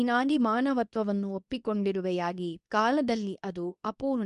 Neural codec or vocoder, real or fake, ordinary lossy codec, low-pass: codec, 24 kHz, 0.9 kbps, WavTokenizer, medium speech release version 2; fake; none; 10.8 kHz